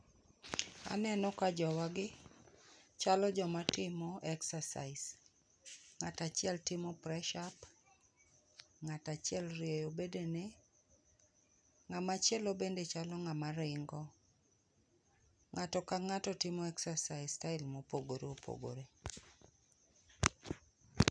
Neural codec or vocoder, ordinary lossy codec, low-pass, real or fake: none; none; 9.9 kHz; real